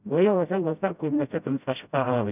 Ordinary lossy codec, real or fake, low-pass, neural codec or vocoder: none; fake; 3.6 kHz; codec, 16 kHz, 0.5 kbps, FreqCodec, smaller model